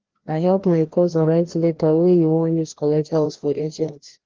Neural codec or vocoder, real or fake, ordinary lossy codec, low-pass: codec, 16 kHz, 1 kbps, FreqCodec, larger model; fake; Opus, 16 kbps; 7.2 kHz